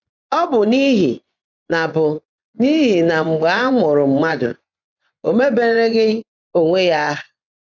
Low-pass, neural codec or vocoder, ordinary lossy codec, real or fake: 7.2 kHz; vocoder, 24 kHz, 100 mel bands, Vocos; none; fake